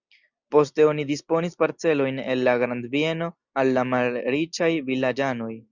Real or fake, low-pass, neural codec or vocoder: real; 7.2 kHz; none